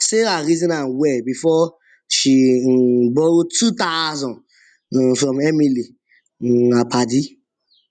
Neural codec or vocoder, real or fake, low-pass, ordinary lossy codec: none; real; 9.9 kHz; none